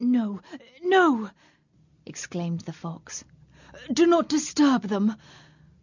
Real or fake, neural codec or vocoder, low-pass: real; none; 7.2 kHz